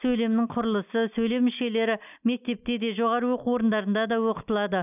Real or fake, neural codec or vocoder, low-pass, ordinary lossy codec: real; none; 3.6 kHz; none